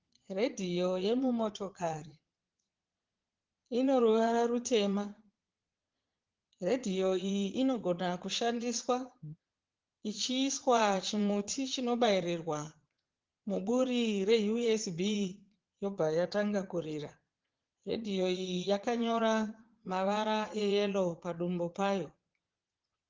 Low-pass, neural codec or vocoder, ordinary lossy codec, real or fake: 7.2 kHz; vocoder, 22.05 kHz, 80 mel bands, Vocos; Opus, 16 kbps; fake